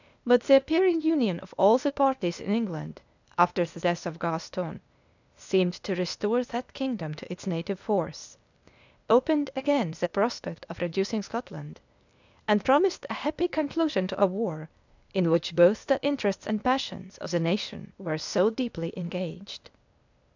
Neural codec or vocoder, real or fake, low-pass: codec, 16 kHz, 0.8 kbps, ZipCodec; fake; 7.2 kHz